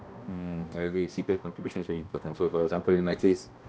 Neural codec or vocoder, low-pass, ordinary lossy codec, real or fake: codec, 16 kHz, 1 kbps, X-Codec, HuBERT features, trained on balanced general audio; none; none; fake